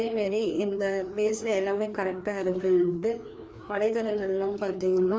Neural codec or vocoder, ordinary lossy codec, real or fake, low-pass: codec, 16 kHz, 2 kbps, FreqCodec, larger model; none; fake; none